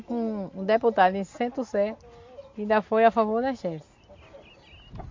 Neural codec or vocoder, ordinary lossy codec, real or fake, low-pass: none; MP3, 48 kbps; real; 7.2 kHz